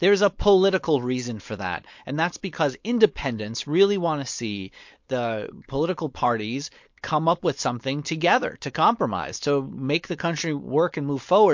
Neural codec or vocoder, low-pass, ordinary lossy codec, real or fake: codec, 16 kHz, 4.8 kbps, FACodec; 7.2 kHz; MP3, 48 kbps; fake